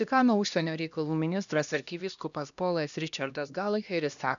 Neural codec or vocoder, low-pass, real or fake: codec, 16 kHz, 1 kbps, X-Codec, HuBERT features, trained on LibriSpeech; 7.2 kHz; fake